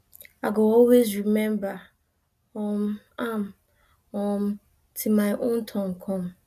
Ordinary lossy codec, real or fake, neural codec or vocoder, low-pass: none; real; none; 14.4 kHz